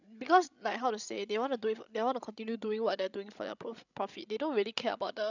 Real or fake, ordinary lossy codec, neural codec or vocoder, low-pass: fake; none; codec, 16 kHz, 16 kbps, FreqCodec, larger model; 7.2 kHz